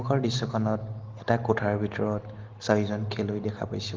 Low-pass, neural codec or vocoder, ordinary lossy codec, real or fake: 7.2 kHz; none; Opus, 16 kbps; real